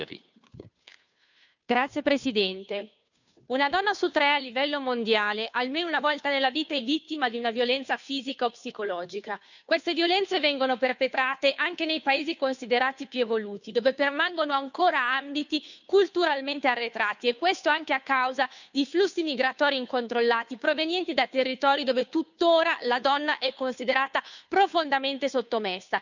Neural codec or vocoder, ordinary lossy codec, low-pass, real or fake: codec, 16 kHz, 2 kbps, FunCodec, trained on Chinese and English, 25 frames a second; none; 7.2 kHz; fake